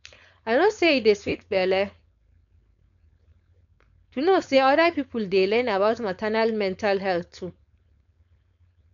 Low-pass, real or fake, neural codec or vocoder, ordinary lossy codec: 7.2 kHz; fake; codec, 16 kHz, 4.8 kbps, FACodec; none